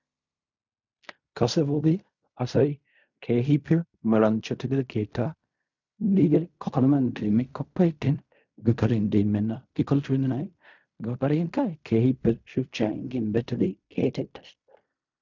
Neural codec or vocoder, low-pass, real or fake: codec, 16 kHz in and 24 kHz out, 0.4 kbps, LongCat-Audio-Codec, fine tuned four codebook decoder; 7.2 kHz; fake